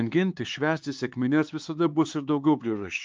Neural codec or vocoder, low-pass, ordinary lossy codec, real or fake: codec, 16 kHz, 4 kbps, X-Codec, HuBERT features, trained on LibriSpeech; 7.2 kHz; Opus, 24 kbps; fake